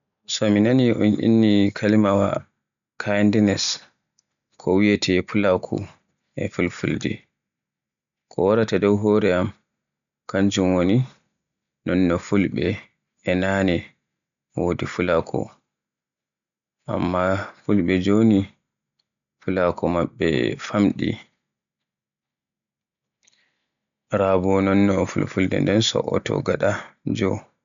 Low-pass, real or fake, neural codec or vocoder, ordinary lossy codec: 7.2 kHz; real; none; MP3, 96 kbps